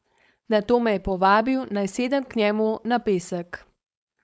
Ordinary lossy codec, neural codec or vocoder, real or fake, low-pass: none; codec, 16 kHz, 4.8 kbps, FACodec; fake; none